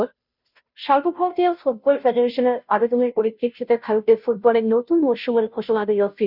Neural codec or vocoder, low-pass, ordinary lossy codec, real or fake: codec, 16 kHz, 0.5 kbps, FunCodec, trained on Chinese and English, 25 frames a second; 5.4 kHz; none; fake